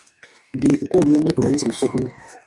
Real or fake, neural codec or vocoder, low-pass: fake; codec, 44.1 kHz, 2.6 kbps, DAC; 10.8 kHz